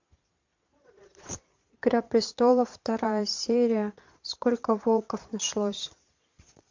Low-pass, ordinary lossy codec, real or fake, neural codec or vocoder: 7.2 kHz; MP3, 48 kbps; fake; vocoder, 22.05 kHz, 80 mel bands, Vocos